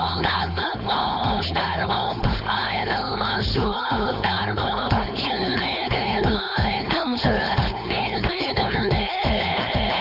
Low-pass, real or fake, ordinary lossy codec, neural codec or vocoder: 5.4 kHz; fake; none; codec, 16 kHz, 4.8 kbps, FACodec